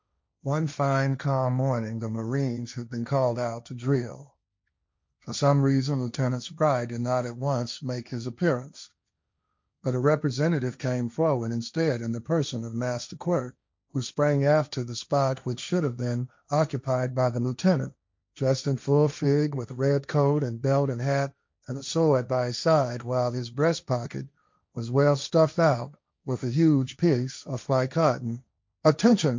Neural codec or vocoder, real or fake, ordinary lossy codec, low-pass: codec, 16 kHz, 1.1 kbps, Voila-Tokenizer; fake; MP3, 64 kbps; 7.2 kHz